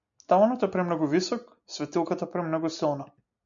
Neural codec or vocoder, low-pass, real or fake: none; 7.2 kHz; real